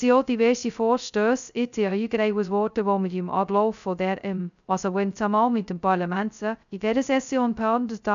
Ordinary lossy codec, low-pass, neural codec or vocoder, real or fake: none; 7.2 kHz; codec, 16 kHz, 0.2 kbps, FocalCodec; fake